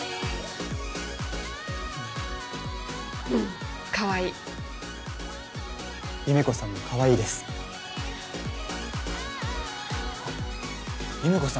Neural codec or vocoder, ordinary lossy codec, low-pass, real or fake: none; none; none; real